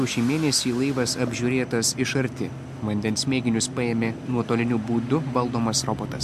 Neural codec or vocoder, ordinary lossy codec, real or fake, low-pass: none; MP3, 64 kbps; real; 14.4 kHz